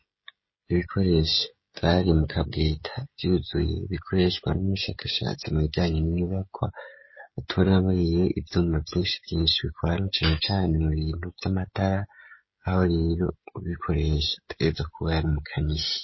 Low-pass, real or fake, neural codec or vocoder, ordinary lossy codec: 7.2 kHz; fake; codec, 16 kHz, 16 kbps, FreqCodec, smaller model; MP3, 24 kbps